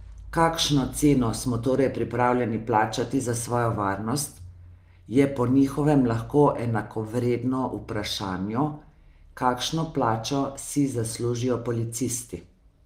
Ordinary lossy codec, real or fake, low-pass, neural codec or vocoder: Opus, 32 kbps; real; 14.4 kHz; none